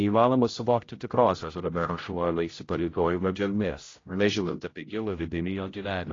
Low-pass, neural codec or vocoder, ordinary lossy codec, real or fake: 7.2 kHz; codec, 16 kHz, 0.5 kbps, X-Codec, HuBERT features, trained on general audio; AAC, 32 kbps; fake